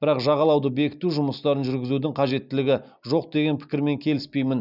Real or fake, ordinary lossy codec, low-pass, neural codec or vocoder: real; none; 5.4 kHz; none